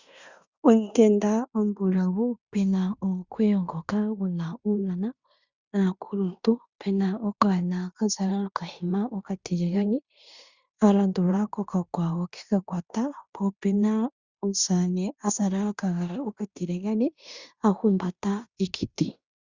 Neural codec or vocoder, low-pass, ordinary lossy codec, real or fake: codec, 16 kHz in and 24 kHz out, 0.9 kbps, LongCat-Audio-Codec, fine tuned four codebook decoder; 7.2 kHz; Opus, 64 kbps; fake